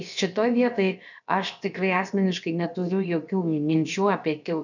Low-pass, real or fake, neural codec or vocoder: 7.2 kHz; fake; codec, 16 kHz, about 1 kbps, DyCAST, with the encoder's durations